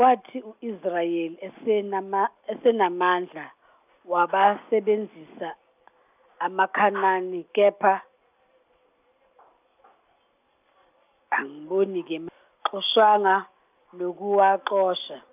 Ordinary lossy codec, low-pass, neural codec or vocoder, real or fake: none; 3.6 kHz; none; real